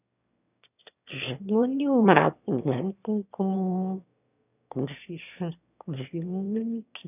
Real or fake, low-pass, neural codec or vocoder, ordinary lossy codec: fake; 3.6 kHz; autoencoder, 22.05 kHz, a latent of 192 numbers a frame, VITS, trained on one speaker; none